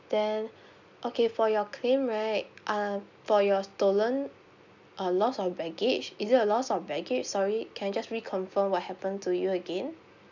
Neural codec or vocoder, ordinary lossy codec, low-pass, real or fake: none; none; 7.2 kHz; real